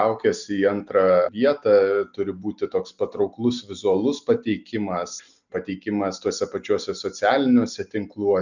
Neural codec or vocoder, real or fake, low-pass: none; real; 7.2 kHz